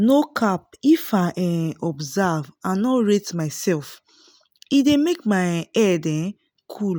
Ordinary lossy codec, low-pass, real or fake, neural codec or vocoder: none; none; real; none